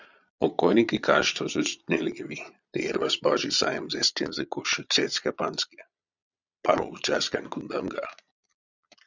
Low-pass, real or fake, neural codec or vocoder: 7.2 kHz; fake; vocoder, 22.05 kHz, 80 mel bands, Vocos